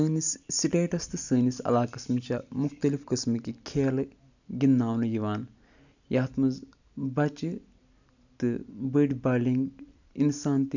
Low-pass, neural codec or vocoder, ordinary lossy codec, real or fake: 7.2 kHz; none; none; real